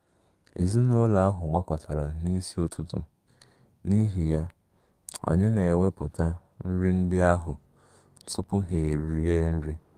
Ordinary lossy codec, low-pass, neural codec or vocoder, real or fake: Opus, 32 kbps; 14.4 kHz; codec, 32 kHz, 1.9 kbps, SNAC; fake